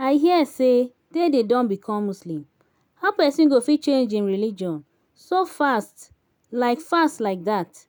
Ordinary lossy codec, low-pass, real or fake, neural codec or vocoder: none; none; real; none